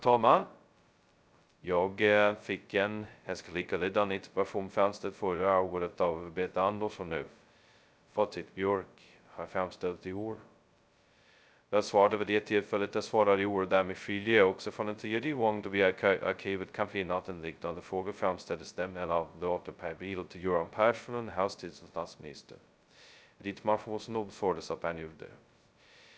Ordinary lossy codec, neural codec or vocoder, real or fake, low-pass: none; codec, 16 kHz, 0.2 kbps, FocalCodec; fake; none